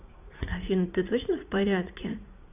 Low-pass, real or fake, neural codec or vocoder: 3.6 kHz; fake; vocoder, 44.1 kHz, 80 mel bands, Vocos